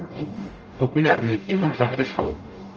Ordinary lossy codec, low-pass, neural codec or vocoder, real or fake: Opus, 24 kbps; 7.2 kHz; codec, 44.1 kHz, 0.9 kbps, DAC; fake